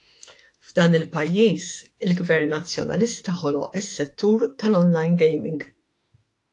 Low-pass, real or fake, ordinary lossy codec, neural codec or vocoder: 10.8 kHz; fake; AAC, 48 kbps; autoencoder, 48 kHz, 32 numbers a frame, DAC-VAE, trained on Japanese speech